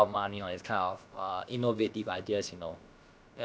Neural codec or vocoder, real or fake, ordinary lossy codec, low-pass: codec, 16 kHz, about 1 kbps, DyCAST, with the encoder's durations; fake; none; none